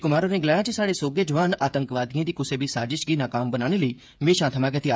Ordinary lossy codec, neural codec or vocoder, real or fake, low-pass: none; codec, 16 kHz, 8 kbps, FreqCodec, smaller model; fake; none